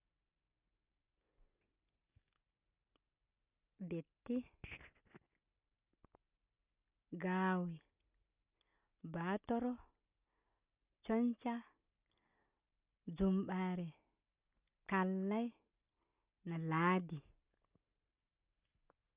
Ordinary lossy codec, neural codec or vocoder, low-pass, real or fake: Opus, 64 kbps; none; 3.6 kHz; real